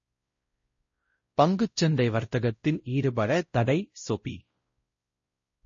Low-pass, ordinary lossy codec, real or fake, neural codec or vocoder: 7.2 kHz; MP3, 32 kbps; fake; codec, 16 kHz, 0.5 kbps, X-Codec, WavLM features, trained on Multilingual LibriSpeech